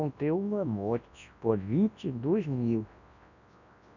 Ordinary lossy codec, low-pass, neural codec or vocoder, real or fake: none; 7.2 kHz; codec, 24 kHz, 0.9 kbps, WavTokenizer, large speech release; fake